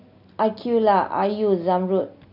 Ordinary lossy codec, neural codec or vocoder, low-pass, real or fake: none; none; 5.4 kHz; real